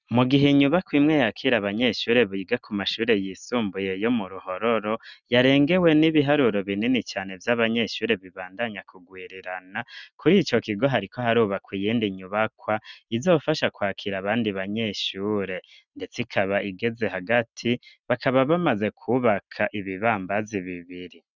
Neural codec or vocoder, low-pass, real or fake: none; 7.2 kHz; real